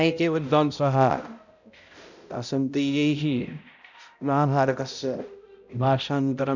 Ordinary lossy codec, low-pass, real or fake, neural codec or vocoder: none; 7.2 kHz; fake; codec, 16 kHz, 0.5 kbps, X-Codec, HuBERT features, trained on balanced general audio